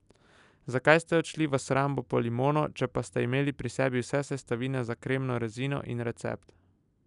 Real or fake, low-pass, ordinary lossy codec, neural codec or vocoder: real; 10.8 kHz; none; none